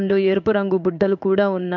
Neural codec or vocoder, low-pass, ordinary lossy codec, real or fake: codec, 16 kHz in and 24 kHz out, 1 kbps, XY-Tokenizer; 7.2 kHz; none; fake